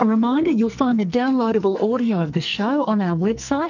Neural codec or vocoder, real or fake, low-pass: codec, 44.1 kHz, 2.6 kbps, SNAC; fake; 7.2 kHz